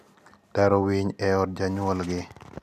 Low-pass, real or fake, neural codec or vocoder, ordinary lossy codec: 14.4 kHz; real; none; none